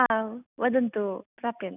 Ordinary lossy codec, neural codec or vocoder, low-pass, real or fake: none; none; 3.6 kHz; real